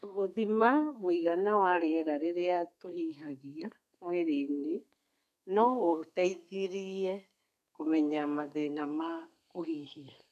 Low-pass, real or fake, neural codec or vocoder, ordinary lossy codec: 14.4 kHz; fake; codec, 32 kHz, 1.9 kbps, SNAC; none